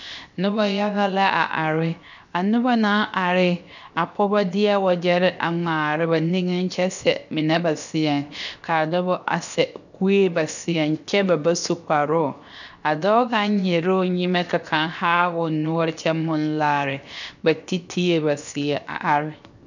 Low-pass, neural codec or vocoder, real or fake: 7.2 kHz; codec, 16 kHz, 0.7 kbps, FocalCodec; fake